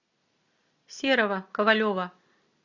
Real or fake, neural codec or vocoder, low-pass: real; none; 7.2 kHz